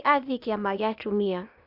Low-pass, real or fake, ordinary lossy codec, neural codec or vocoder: 5.4 kHz; fake; none; codec, 16 kHz, 0.8 kbps, ZipCodec